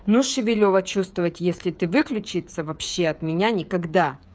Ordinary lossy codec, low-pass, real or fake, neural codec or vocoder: none; none; fake; codec, 16 kHz, 8 kbps, FreqCodec, smaller model